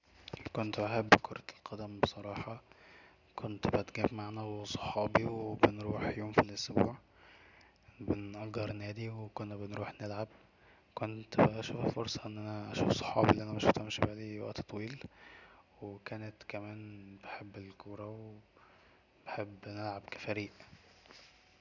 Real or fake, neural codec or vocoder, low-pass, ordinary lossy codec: real; none; 7.2 kHz; none